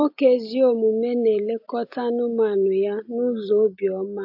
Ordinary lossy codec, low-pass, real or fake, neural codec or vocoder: AAC, 48 kbps; 5.4 kHz; real; none